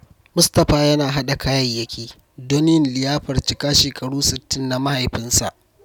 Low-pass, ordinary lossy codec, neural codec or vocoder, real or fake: 19.8 kHz; none; none; real